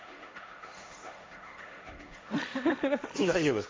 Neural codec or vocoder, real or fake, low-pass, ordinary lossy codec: codec, 16 kHz, 1.1 kbps, Voila-Tokenizer; fake; none; none